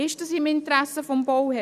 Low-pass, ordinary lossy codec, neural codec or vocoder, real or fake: 14.4 kHz; none; none; real